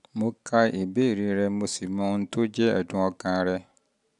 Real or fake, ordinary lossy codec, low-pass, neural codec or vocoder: real; none; 10.8 kHz; none